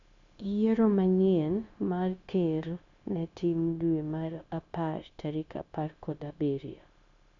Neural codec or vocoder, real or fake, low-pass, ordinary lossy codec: codec, 16 kHz, 0.9 kbps, LongCat-Audio-Codec; fake; 7.2 kHz; none